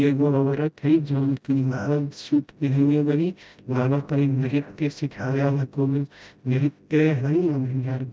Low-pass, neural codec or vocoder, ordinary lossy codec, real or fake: none; codec, 16 kHz, 0.5 kbps, FreqCodec, smaller model; none; fake